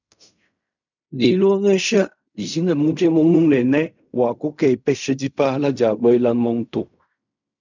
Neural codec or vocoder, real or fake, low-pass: codec, 16 kHz in and 24 kHz out, 0.4 kbps, LongCat-Audio-Codec, fine tuned four codebook decoder; fake; 7.2 kHz